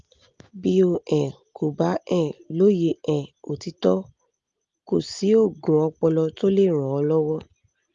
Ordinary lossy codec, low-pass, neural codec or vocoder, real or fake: Opus, 32 kbps; 7.2 kHz; none; real